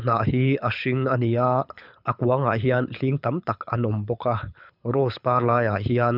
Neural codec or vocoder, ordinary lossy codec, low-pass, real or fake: codec, 24 kHz, 6 kbps, HILCodec; none; 5.4 kHz; fake